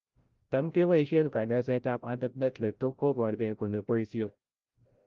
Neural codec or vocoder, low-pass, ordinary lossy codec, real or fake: codec, 16 kHz, 0.5 kbps, FreqCodec, larger model; 7.2 kHz; Opus, 32 kbps; fake